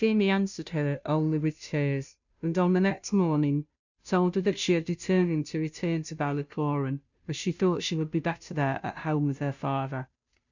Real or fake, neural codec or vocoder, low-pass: fake; codec, 16 kHz, 0.5 kbps, FunCodec, trained on Chinese and English, 25 frames a second; 7.2 kHz